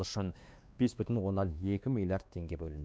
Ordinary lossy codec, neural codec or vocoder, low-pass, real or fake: none; codec, 16 kHz, 2 kbps, X-Codec, WavLM features, trained on Multilingual LibriSpeech; none; fake